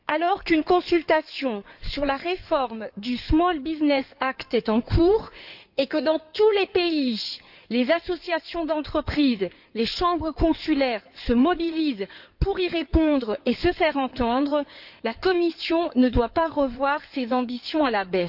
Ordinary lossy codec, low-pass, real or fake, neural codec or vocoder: none; 5.4 kHz; fake; codec, 16 kHz in and 24 kHz out, 2.2 kbps, FireRedTTS-2 codec